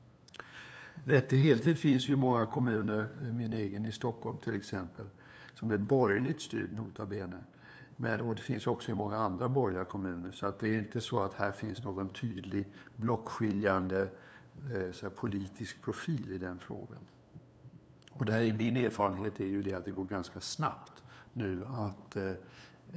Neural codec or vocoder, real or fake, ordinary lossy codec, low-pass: codec, 16 kHz, 2 kbps, FunCodec, trained on LibriTTS, 25 frames a second; fake; none; none